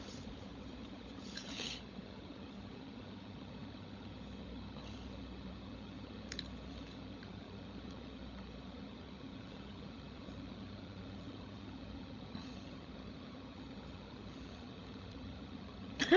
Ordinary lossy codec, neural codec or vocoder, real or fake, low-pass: none; codec, 16 kHz, 16 kbps, FunCodec, trained on LibriTTS, 50 frames a second; fake; 7.2 kHz